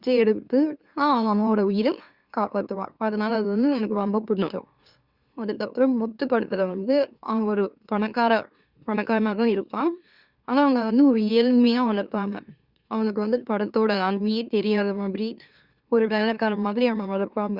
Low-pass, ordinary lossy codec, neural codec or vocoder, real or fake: 5.4 kHz; Opus, 64 kbps; autoencoder, 44.1 kHz, a latent of 192 numbers a frame, MeloTTS; fake